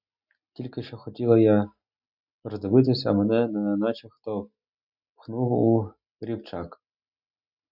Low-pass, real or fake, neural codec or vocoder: 5.4 kHz; real; none